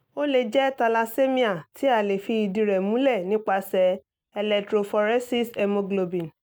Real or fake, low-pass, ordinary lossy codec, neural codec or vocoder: real; none; none; none